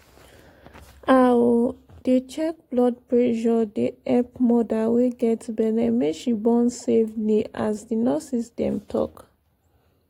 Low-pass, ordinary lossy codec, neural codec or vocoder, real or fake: 19.8 kHz; AAC, 48 kbps; none; real